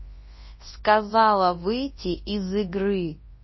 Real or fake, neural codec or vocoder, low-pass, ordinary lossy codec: fake; codec, 24 kHz, 0.9 kbps, WavTokenizer, large speech release; 7.2 kHz; MP3, 24 kbps